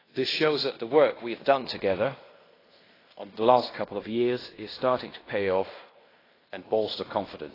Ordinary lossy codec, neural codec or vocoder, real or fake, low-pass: AAC, 24 kbps; codec, 16 kHz in and 24 kHz out, 0.9 kbps, LongCat-Audio-Codec, fine tuned four codebook decoder; fake; 5.4 kHz